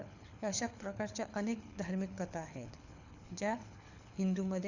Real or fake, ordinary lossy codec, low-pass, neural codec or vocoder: fake; none; 7.2 kHz; codec, 24 kHz, 6 kbps, HILCodec